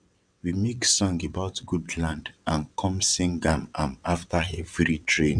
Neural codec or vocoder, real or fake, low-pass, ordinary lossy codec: vocoder, 22.05 kHz, 80 mel bands, WaveNeXt; fake; 9.9 kHz; none